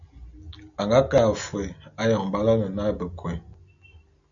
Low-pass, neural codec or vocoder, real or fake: 7.2 kHz; none; real